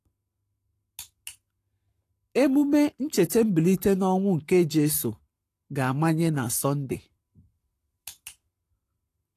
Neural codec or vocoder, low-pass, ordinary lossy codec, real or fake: codec, 44.1 kHz, 7.8 kbps, Pupu-Codec; 14.4 kHz; AAC, 48 kbps; fake